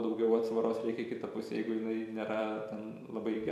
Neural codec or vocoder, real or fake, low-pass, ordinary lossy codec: vocoder, 44.1 kHz, 128 mel bands every 256 samples, BigVGAN v2; fake; 14.4 kHz; MP3, 64 kbps